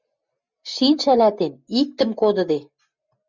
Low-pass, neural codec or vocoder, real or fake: 7.2 kHz; none; real